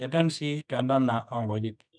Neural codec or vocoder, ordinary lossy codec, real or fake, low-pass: codec, 24 kHz, 0.9 kbps, WavTokenizer, medium music audio release; none; fake; 9.9 kHz